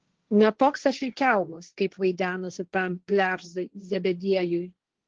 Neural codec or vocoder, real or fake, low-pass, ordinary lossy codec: codec, 16 kHz, 1.1 kbps, Voila-Tokenizer; fake; 7.2 kHz; Opus, 16 kbps